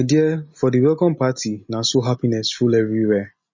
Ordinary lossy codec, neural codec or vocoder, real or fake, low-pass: MP3, 32 kbps; none; real; 7.2 kHz